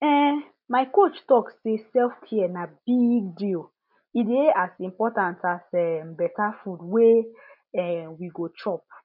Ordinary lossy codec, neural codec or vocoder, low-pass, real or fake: none; none; 5.4 kHz; real